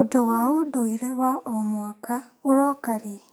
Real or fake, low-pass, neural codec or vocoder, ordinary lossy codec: fake; none; codec, 44.1 kHz, 2.6 kbps, SNAC; none